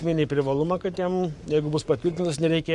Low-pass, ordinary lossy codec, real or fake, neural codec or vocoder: 10.8 kHz; MP3, 64 kbps; fake; codec, 44.1 kHz, 7.8 kbps, Pupu-Codec